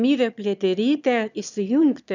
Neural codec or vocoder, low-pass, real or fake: autoencoder, 22.05 kHz, a latent of 192 numbers a frame, VITS, trained on one speaker; 7.2 kHz; fake